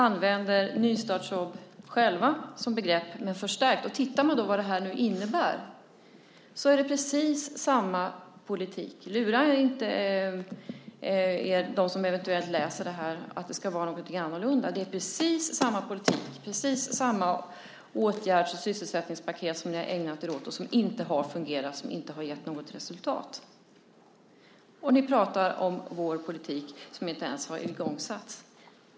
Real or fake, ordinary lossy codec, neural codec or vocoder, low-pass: real; none; none; none